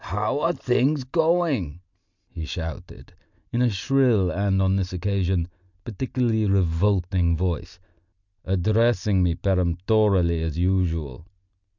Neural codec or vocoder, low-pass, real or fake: none; 7.2 kHz; real